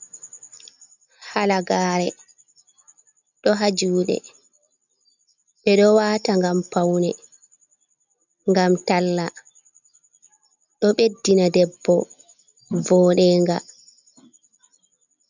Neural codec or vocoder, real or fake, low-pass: none; real; 7.2 kHz